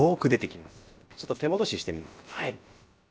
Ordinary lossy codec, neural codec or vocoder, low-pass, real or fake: none; codec, 16 kHz, about 1 kbps, DyCAST, with the encoder's durations; none; fake